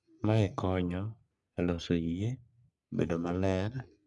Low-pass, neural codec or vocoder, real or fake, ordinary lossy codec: 10.8 kHz; codec, 44.1 kHz, 3.4 kbps, Pupu-Codec; fake; none